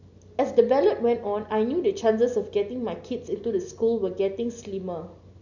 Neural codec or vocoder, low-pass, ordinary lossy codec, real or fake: none; 7.2 kHz; none; real